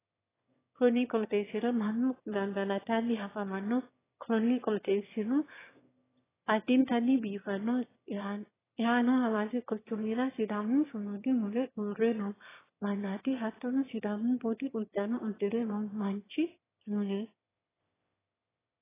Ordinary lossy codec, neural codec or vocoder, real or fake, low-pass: AAC, 16 kbps; autoencoder, 22.05 kHz, a latent of 192 numbers a frame, VITS, trained on one speaker; fake; 3.6 kHz